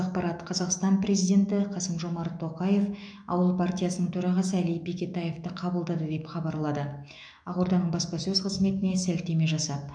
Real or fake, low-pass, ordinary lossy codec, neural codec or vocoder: fake; 9.9 kHz; none; autoencoder, 48 kHz, 128 numbers a frame, DAC-VAE, trained on Japanese speech